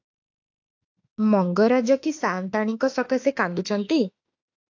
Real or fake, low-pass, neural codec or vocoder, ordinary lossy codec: fake; 7.2 kHz; autoencoder, 48 kHz, 32 numbers a frame, DAC-VAE, trained on Japanese speech; AAC, 48 kbps